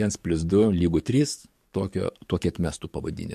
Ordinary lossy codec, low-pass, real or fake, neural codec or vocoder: MP3, 64 kbps; 14.4 kHz; fake; codec, 44.1 kHz, 7.8 kbps, DAC